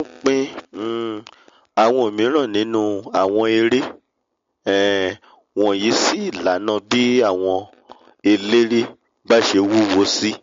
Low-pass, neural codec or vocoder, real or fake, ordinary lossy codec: 7.2 kHz; none; real; MP3, 48 kbps